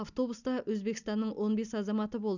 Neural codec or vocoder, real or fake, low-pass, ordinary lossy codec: none; real; 7.2 kHz; none